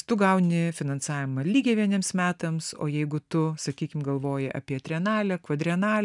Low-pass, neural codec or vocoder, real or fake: 10.8 kHz; none; real